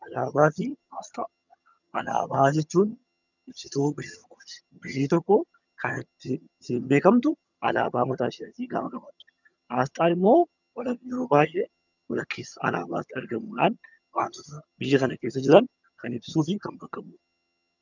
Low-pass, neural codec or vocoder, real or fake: 7.2 kHz; vocoder, 22.05 kHz, 80 mel bands, HiFi-GAN; fake